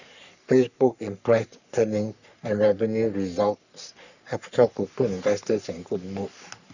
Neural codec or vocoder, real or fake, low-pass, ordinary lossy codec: codec, 44.1 kHz, 3.4 kbps, Pupu-Codec; fake; 7.2 kHz; none